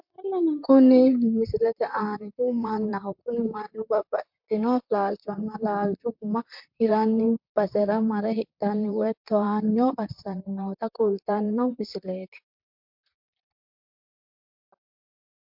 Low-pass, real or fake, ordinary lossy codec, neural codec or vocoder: 5.4 kHz; fake; MP3, 48 kbps; vocoder, 44.1 kHz, 128 mel bands, Pupu-Vocoder